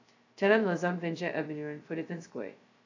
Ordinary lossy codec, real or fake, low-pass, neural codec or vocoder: AAC, 48 kbps; fake; 7.2 kHz; codec, 16 kHz, 0.2 kbps, FocalCodec